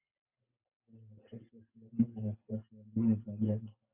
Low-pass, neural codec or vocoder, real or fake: 3.6 kHz; none; real